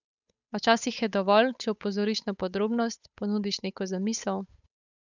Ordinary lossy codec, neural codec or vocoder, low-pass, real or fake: none; codec, 16 kHz, 8 kbps, FunCodec, trained on Chinese and English, 25 frames a second; 7.2 kHz; fake